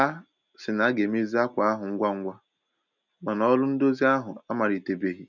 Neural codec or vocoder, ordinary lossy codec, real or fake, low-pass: none; none; real; 7.2 kHz